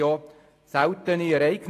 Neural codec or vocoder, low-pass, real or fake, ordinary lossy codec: none; 14.4 kHz; real; AAC, 48 kbps